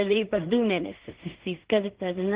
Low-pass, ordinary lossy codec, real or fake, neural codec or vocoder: 3.6 kHz; Opus, 16 kbps; fake; codec, 16 kHz in and 24 kHz out, 0.4 kbps, LongCat-Audio-Codec, two codebook decoder